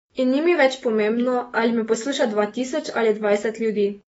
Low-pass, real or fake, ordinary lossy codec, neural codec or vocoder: 19.8 kHz; fake; AAC, 24 kbps; autoencoder, 48 kHz, 128 numbers a frame, DAC-VAE, trained on Japanese speech